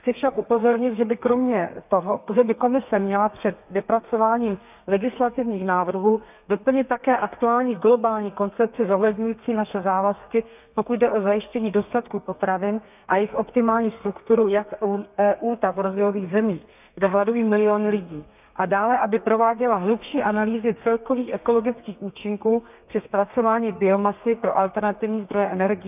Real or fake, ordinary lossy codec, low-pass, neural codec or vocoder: fake; none; 3.6 kHz; codec, 32 kHz, 1.9 kbps, SNAC